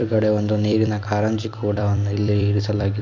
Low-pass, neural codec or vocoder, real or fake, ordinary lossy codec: 7.2 kHz; vocoder, 44.1 kHz, 128 mel bands every 256 samples, BigVGAN v2; fake; MP3, 48 kbps